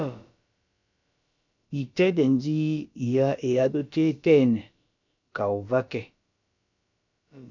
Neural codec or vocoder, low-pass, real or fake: codec, 16 kHz, about 1 kbps, DyCAST, with the encoder's durations; 7.2 kHz; fake